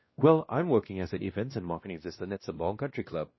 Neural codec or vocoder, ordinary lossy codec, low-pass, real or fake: codec, 16 kHz, 1 kbps, X-Codec, WavLM features, trained on Multilingual LibriSpeech; MP3, 24 kbps; 7.2 kHz; fake